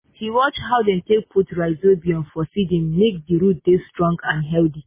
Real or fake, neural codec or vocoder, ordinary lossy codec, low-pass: real; none; MP3, 16 kbps; 3.6 kHz